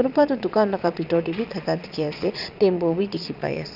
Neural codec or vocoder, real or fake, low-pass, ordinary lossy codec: vocoder, 22.05 kHz, 80 mel bands, Vocos; fake; 5.4 kHz; none